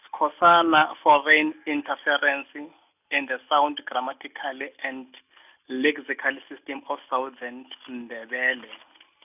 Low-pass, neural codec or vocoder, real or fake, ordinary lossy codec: 3.6 kHz; none; real; none